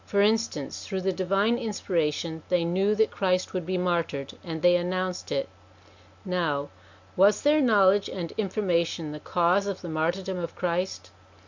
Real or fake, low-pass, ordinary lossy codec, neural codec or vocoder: real; 7.2 kHz; MP3, 64 kbps; none